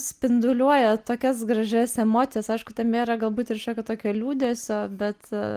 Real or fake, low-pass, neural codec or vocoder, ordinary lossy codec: real; 14.4 kHz; none; Opus, 24 kbps